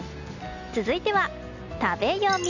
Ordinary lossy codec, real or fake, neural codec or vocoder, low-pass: none; real; none; 7.2 kHz